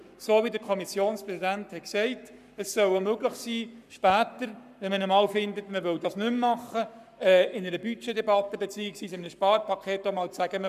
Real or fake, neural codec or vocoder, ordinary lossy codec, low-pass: fake; codec, 44.1 kHz, 7.8 kbps, Pupu-Codec; none; 14.4 kHz